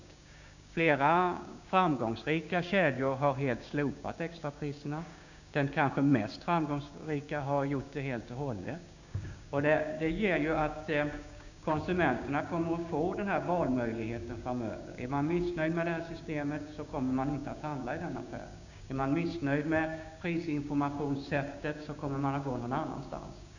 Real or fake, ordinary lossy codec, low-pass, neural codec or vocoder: fake; none; 7.2 kHz; codec, 16 kHz, 6 kbps, DAC